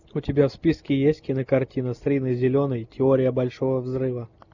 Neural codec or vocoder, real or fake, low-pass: none; real; 7.2 kHz